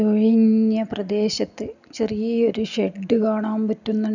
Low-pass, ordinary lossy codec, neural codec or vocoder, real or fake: 7.2 kHz; none; none; real